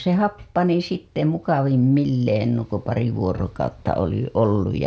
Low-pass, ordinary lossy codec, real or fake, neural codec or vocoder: none; none; real; none